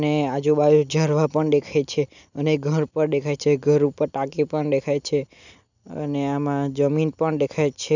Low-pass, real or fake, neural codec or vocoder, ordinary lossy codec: 7.2 kHz; real; none; none